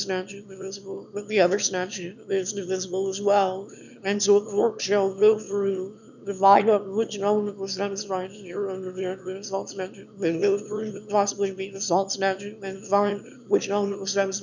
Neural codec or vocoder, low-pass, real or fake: autoencoder, 22.05 kHz, a latent of 192 numbers a frame, VITS, trained on one speaker; 7.2 kHz; fake